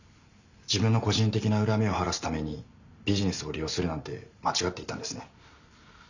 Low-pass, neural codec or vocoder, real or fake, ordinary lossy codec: 7.2 kHz; none; real; none